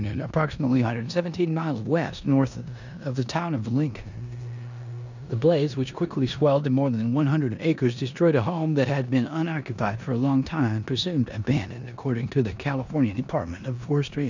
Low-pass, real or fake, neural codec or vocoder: 7.2 kHz; fake; codec, 16 kHz in and 24 kHz out, 0.9 kbps, LongCat-Audio-Codec, four codebook decoder